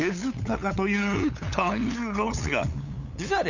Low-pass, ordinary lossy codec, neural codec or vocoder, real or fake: 7.2 kHz; none; codec, 16 kHz, 8 kbps, FunCodec, trained on LibriTTS, 25 frames a second; fake